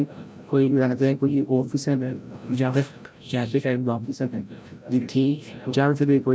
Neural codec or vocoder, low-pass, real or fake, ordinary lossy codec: codec, 16 kHz, 0.5 kbps, FreqCodec, larger model; none; fake; none